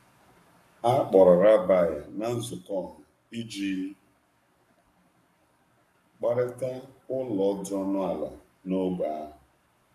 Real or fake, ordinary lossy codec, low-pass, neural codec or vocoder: fake; none; 14.4 kHz; codec, 44.1 kHz, 7.8 kbps, Pupu-Codec